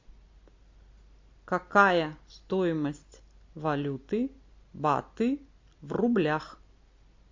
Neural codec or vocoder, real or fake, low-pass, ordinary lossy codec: none; real; 7.2 kHz; MP3, 48 kbps